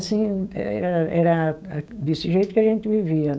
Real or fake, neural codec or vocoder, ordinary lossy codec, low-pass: fake; codec, 16 kHz, 6 kbps, DAC; none; none